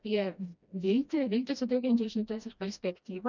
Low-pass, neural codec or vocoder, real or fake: 7.2 kHz; codec, 16 kHz, 1 kbps, FreqCodec, smaller model; fake